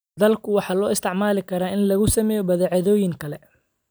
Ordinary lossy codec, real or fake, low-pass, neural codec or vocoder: none; real; none; none